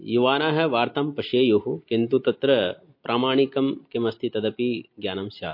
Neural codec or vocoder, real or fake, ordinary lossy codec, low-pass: none; real; MP3, 32 kbps; 5.4 kHz